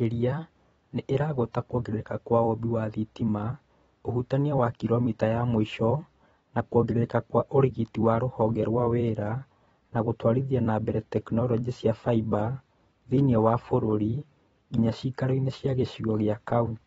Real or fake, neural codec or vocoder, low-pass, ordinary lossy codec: fake; vocoder, 44.1 kHz, 128 mel bands every 256 samples, BigVGAN v2; 19.8 kHz; AAC, 24 kbps